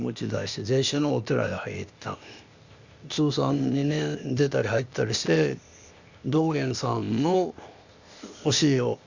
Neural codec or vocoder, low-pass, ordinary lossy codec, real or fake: codec, 16 kHz, 0.8 kbps, ZipCodec; 7.2 kHz; Opus, 64 kbps; fake